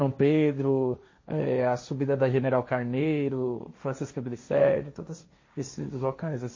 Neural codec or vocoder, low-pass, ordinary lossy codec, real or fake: codec, 16 kHz, 1.1 kbps, Voila-Tokenizer; 7.2 kHz; MP3, 32 kbps; fake